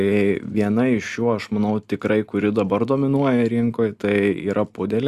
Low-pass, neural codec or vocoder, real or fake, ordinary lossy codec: 14.4 kHz; none; real; Opus, 64 kbps